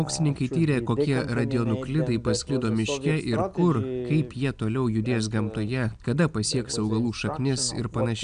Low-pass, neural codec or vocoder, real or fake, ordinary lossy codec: 9.9 kHz; none; real; MP3, 96 kbps